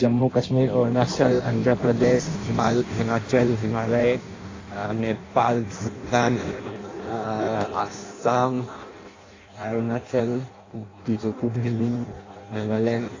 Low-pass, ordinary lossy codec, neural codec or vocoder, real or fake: 7.2 kHz; AAC, 32 kbps; codec, 16 kHz in and 24 kHz out, 0.6 kbps, FireRedTTS-2 codec; fake